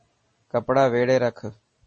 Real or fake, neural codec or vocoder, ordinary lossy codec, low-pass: real; none; MP3, 32 kbps; 9.9 kHz